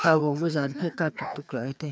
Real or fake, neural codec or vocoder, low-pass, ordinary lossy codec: fake; codec, 16 kHz, 2 kbps, FreqCodec, larger model; none; none